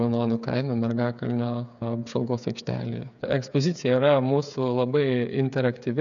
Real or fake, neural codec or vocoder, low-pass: fake; codec, 16 kHz, 8 kbps, FreqCodec, smaller model; 7.2 kHz